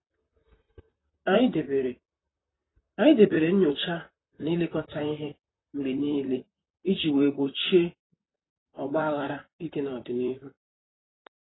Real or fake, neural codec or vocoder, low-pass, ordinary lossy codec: fake; vocoder, 44.1 kHz, 128 mel bands, Pupu-Vocoder; 7.2 kHz; AAC, 16 kbps